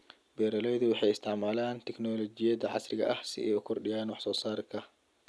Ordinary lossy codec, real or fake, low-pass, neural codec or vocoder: none; real; none; none